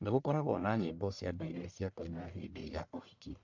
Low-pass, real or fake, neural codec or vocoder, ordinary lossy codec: 7.2 kHz; fake; codec, 44.1 kHz, 1.7 kbps, Pupu-Codec; none